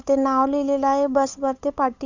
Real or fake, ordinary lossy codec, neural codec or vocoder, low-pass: fake; Opus, 64 kbps; codec, 16 kHz, 8 kbps, FunCodec, trained on Chinese and English, 25 frames a second; 7.2 kHz